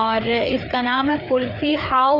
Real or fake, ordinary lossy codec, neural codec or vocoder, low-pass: fake; none; codec, 16 kHz, 4 kbps, FreqCodec, larger model; 5.4 kHz